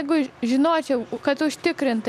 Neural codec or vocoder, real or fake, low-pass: none; real; 14.4 kHz